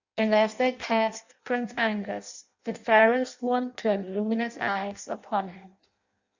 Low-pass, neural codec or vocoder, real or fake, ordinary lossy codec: 7.2 kHz; codec, 16 kHz in and 24 kHz out, 0.6 kbps, FireRedTTS-2 codec; fake; Opus, 64 kbps